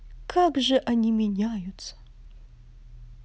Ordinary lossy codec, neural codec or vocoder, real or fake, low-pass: none; none; real; none